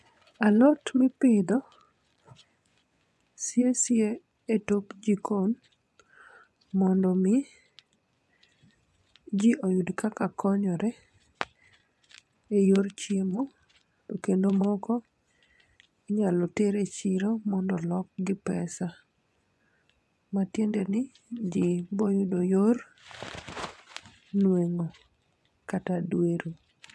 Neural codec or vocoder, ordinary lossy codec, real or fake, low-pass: vocoder, 24 kHz, 100 mel bands, Vocos; none; fake; none